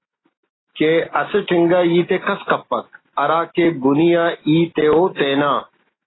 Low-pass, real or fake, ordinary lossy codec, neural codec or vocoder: 7.2 kHz; real; AAC, 16 kbps; none